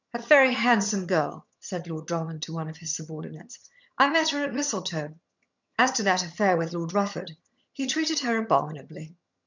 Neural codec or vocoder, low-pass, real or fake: vocoder, 22.05 kHz, 80 mel bands, HiFi-GAN; 7.2 kHz; fake